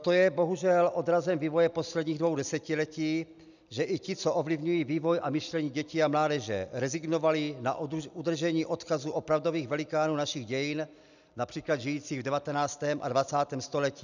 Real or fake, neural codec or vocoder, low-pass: real; none; 7.2 kHz